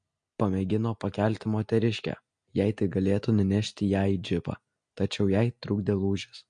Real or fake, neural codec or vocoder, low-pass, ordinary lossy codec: real; none; 9.9 kHz; MP3, 48 kbps